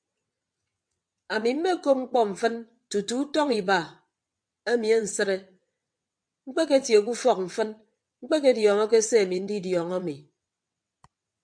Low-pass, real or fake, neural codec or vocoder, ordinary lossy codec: 9.9 kHz; fake; vocoder, 22.05 kHz, 80 mel bands, WaveNeXt; MP3, 64 kbps